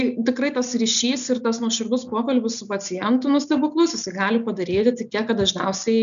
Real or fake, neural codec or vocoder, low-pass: real; none; 7.2 kHz